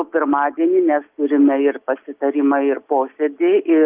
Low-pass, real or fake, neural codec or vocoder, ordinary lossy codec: 3.6 kHz; real; none; Opus, 24 kbps